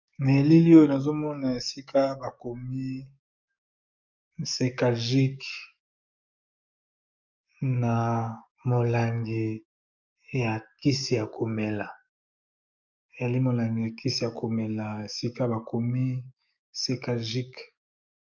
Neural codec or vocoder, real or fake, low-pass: codec, 44.1 kHz, 7.8 kbps, DAC; fake; 7.2 kHz